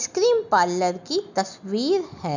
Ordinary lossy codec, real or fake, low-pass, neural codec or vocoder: none; real; 7.2 kHz; none